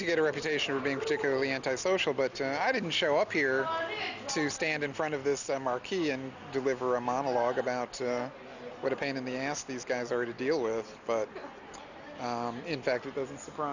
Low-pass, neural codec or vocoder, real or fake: 7.2 kHz; none; real